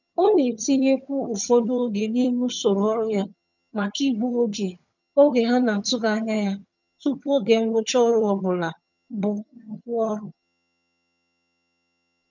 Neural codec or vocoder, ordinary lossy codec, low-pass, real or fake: vocoder, 22.05 kHz, 80 mel bands, HiFi-GAN; none; 7.2 kHz; fake